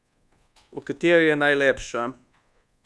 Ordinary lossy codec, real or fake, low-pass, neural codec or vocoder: none; fake; none; codec, 24 kHz, 1.2 kbps, DualCodec